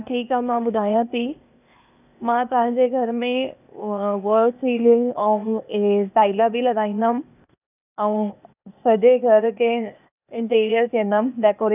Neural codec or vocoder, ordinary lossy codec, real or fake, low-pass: codec, 16 kHz, 0.8 kbps, ZipCodec; none; fake; 3.6 kHz